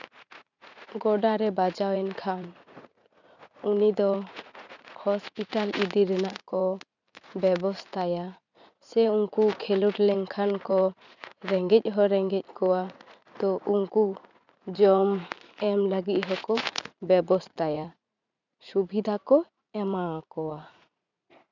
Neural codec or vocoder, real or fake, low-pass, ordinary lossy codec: vocoder, 44.1 kHz, 80 mel bands, Vocos; fake; 7.2 kHz; none